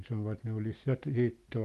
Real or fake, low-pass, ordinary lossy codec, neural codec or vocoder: real; 14.4 kHz; Opus, 24 kbps; none